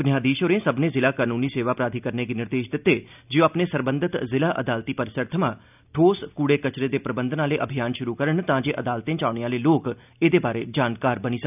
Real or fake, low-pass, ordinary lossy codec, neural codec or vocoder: real; 3.6 kHz; none; none